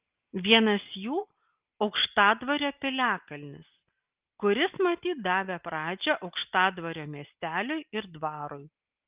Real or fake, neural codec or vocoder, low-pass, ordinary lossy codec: real; none; 3.6 kHz; Opus, 24 kbps